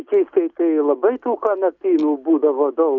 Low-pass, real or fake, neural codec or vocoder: 7.2 kHz; real; none